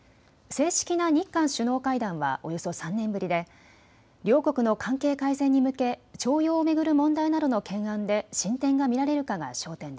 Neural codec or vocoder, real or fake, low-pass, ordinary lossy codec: none; real; none; none